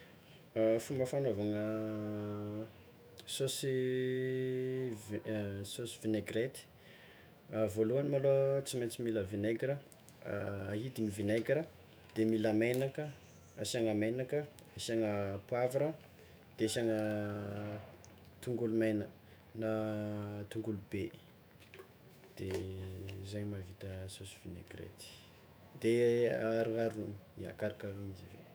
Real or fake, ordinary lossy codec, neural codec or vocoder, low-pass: fake; none; autoencoder, 48 kHz, 128 numbers a frame, DAC-VAE, trained on Japanese speech; none